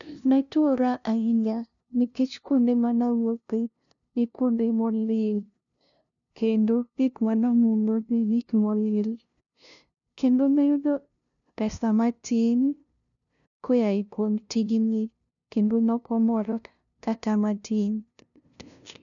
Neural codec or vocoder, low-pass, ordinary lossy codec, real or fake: codec, 16 kHz, 0.5 kbps, FunCodec, trained on LibriTTS, 25 frames a second; 7.2 kHz; none; fake